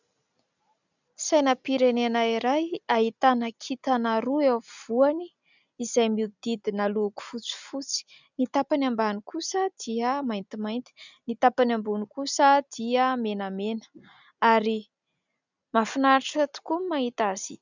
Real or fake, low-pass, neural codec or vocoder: real; 7.2 kHz; none